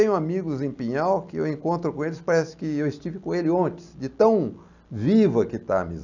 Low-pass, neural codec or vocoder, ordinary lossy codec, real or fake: 7.2 kHz; none; none; real